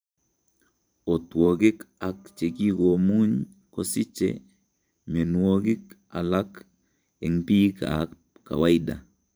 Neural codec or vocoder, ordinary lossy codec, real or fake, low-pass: vocoder, 44.1 kHz, 128 mel bands every 512 samples, BigVGAN v2; none; fake; none